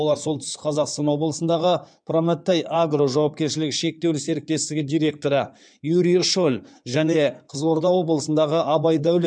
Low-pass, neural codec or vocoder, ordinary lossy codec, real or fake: 9.9 kHz; codec, 16 kHz in and 24 kHz out, 2.2 kbps, FireRedTTS-2 codec; none; fake